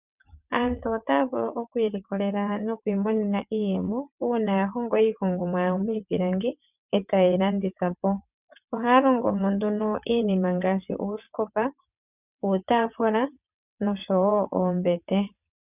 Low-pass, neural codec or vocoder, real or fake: 3.6 kHz; vocoder, 22.05 kHz, 80 mel bands, WaveNeXt; fake